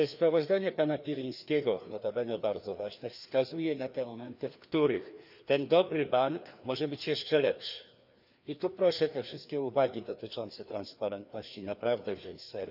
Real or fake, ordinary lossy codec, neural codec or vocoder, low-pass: fake; none; codec, 16 kHz, 2 kbps, FreqCodec, larger model; 5.4 kHz